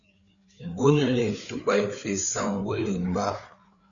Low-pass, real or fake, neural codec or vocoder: 7.2 kHz; fake; codec, 16 kHz, 4 kbps, FreqCodec, larger model